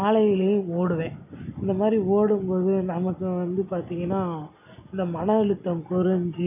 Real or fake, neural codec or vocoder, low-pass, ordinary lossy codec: real; none; 3.6 kHz; AAC, 32 kbps